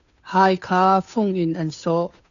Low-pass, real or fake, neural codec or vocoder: 7.2 kHz; fake; codec, 16 kHz, 2 kbps, FunCodec, trained on Chinese and English, 25 frames a second